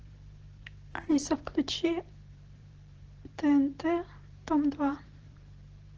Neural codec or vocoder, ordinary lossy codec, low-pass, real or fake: vocoder, 44.1 kHz, 128 mel bands every 512 samples, BigVGAN v2; Opus, 16 kbps; 7.2 kHz; fake